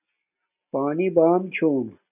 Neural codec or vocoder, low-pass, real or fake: none; 3.6 kHz; real